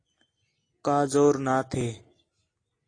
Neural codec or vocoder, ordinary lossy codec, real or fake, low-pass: none; AAC, 32 kbps; real; 9.9 kHz